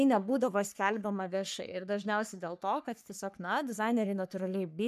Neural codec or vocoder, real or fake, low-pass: codec, 44.1 kHz, 3.4 kbps, Pupu-Codec; fake; 14.4 kHz